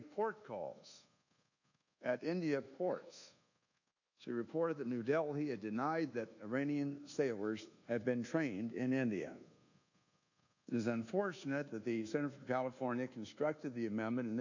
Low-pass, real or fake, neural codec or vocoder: 7.2 kHz; fake; codec, 24 kHz, 1.2 kbps, DualCodec